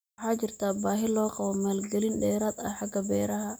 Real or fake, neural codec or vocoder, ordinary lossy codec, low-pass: real; none; none; none